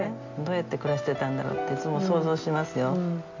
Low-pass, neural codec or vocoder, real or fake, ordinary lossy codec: 7.2 kHz; none; real; MP3, 48 kbps